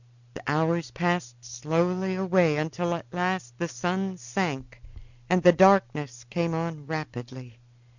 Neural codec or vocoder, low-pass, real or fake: none; 7.2 kHz; real